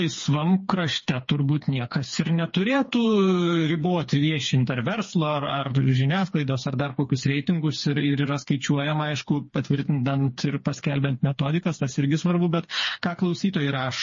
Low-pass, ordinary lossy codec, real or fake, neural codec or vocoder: 7.2 kHz; MP3, 32 kbps; fake; codec, 16 kHz, 4 kbps, FreqCodec, smaller model